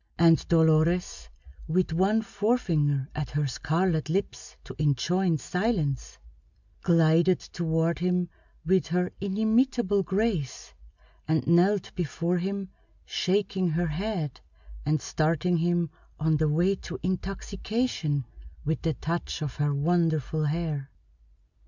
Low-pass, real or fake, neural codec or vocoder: 7.2 kHz; real; none